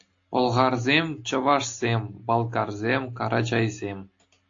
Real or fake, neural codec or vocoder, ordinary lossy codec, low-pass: real; none; AAC, 48 kbps; 7.2 kHz